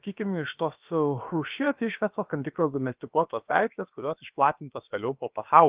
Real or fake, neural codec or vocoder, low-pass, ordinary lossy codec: fake; codec, 16 kHz, about 1 kbps, DyCAST, with the encoder's durations; 3.6 kHz; Opus, 32 kbps